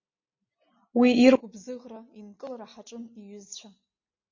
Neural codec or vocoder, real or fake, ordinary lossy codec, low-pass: none; real; MP3, 32 kbps; 7.2 kHz